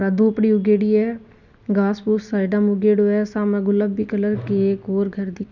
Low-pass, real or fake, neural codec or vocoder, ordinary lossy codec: 7.2 kHz; real; none; none